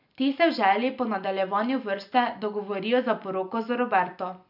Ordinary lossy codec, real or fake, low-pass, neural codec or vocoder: none; real; 5.4 kHz; none